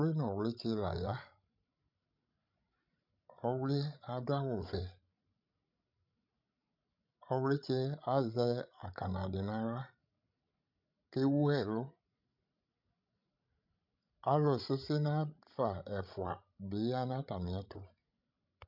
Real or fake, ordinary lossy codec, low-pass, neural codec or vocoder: fake; MP3, 48 kbps; 5.4 kHz; codec, 16 kHz, 16 kbps, FreqCodec, larger model